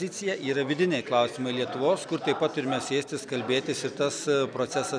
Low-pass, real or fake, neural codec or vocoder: 9.9 kHz; real; none